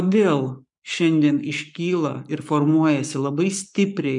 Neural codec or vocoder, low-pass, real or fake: codec, 44.1 kHz, 7.8 kbps, Pupu-Codec; 10.8 kHz; fake